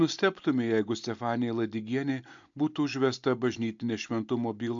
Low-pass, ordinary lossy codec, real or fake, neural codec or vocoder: 7.2 kHz; AAC, 64 kbps; real; none